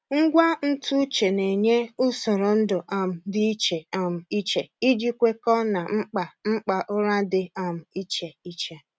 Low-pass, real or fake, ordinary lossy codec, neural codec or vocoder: none; real; none; none